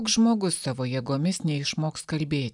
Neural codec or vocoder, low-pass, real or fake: none; 10.8 kHz; real